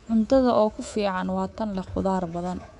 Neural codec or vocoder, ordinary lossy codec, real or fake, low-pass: codec, 24 kHz, 3.1 kbps, DualCodec; none; fake; 10.8 kHz